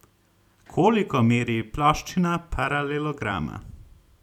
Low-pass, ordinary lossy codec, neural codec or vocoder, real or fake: 19.8 kHz; none; vocoder, 44.1 kHz, 128 mel bands every 256 samples, BigVGAN v2; fake